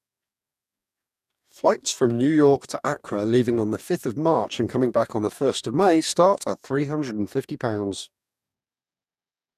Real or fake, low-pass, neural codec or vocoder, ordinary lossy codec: fake; 14.4 kHz; codec, 44.1 kHz, 2.6 kbps, DAC; none